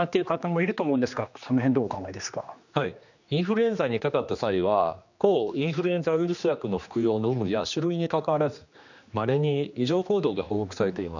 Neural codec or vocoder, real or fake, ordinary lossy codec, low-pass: codec, 16 kHz, 2 kbps, X-Codec, HuBERT features, trained on general audio; fake; none; 7.2 kHz